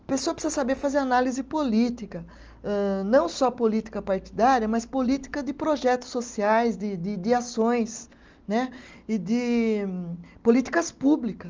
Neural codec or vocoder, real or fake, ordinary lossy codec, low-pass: none; real; Opus, 24 kbps; 7.2 kHz